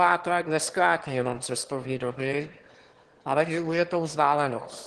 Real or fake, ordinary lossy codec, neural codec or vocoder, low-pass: fake; Opus, 16 kbps; autoencoder, 22.05 kHz, a latent of 192 numbers a frame, VITS, trained on one speaker; 9.9 kHz